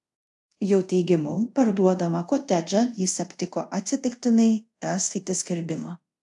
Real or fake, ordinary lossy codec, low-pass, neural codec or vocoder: fake; AAC, 64 kbps; 10.8 kHz; codec, 24 kHz, 0.5 kbps, DualCodec